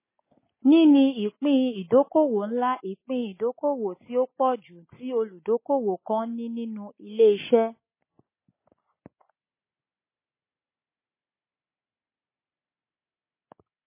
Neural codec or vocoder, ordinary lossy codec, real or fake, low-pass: none; MP3, 16 kbps; real; 3.6 kHz